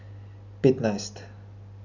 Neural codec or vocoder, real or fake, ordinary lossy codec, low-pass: none; real; none; 7.2 kHz